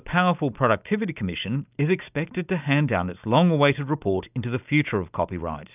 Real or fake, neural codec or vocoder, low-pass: real; none; 3.6 kHz